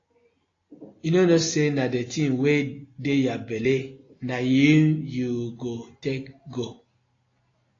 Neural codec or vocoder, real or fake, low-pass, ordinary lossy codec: none; real; 7.2 kHz; AAC, 32 kbps